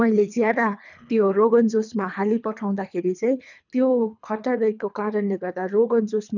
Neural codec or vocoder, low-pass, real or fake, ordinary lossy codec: codec, 24 kHz, 3 kbps, HILCodec; 7.2 kHz; fake; none